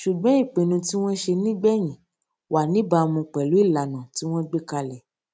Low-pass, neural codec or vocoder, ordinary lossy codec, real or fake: none; none; none; real